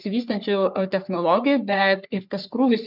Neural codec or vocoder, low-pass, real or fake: codec, 44.1 kHz, 3.4 kbps, Pupu-Codec; 5.4 kHz; fake